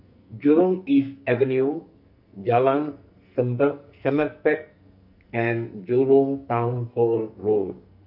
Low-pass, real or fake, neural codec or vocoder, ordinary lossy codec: 5.4 kHz; fake; codec, 44.1 kHz, 2.6 kbps, SNAC; none